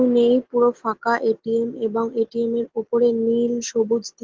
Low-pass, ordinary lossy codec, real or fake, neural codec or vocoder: 7.2 kHz; Opus, 16 kbps; real; none